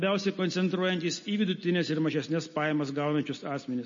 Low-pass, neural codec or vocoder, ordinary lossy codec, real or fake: 7.2 kHz; none; MP3, 32 kbps; real